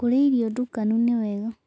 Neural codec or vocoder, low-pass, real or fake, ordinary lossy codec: none; none; real; none